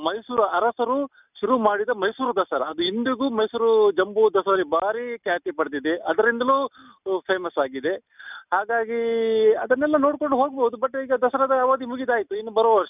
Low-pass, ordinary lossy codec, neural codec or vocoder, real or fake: 3.6 kHz; none; none; real